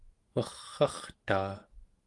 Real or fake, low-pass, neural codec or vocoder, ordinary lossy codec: fake; 10.8 kHz; autoencoder, 48 kHz, 128 numbers a frame, DAC-VAE, trained on Japanese speech; Opus, 24 kbps